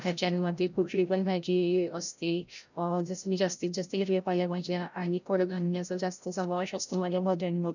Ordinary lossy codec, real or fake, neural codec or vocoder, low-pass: none; fake; codec, 16 kHz, 0.5 kbps, FreqCodec, larger model; 7.2 kHz